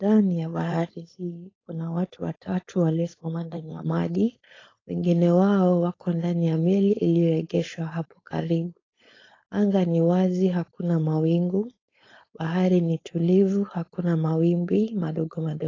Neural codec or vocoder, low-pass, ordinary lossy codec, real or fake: codec, 16 kHz, 4.8 kbps, FACodec; 7.2 kHz; AAC, 32 kbps; fake